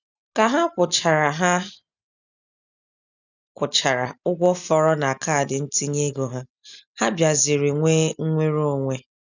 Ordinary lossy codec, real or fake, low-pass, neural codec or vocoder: none; real; 7.2 kHz; none